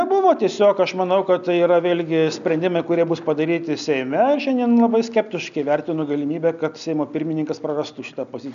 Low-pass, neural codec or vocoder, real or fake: 7.2 kHz; none; real